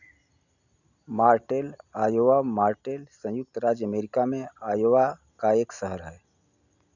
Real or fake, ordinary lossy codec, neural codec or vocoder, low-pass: real; none; none; 7.2 kHz